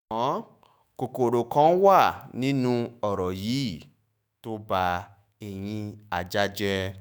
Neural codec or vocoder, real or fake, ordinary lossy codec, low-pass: autoencoder, 48 kHz, 128 numbers a frame, DAC-VAE, trained on Japanese speech; fake; none; none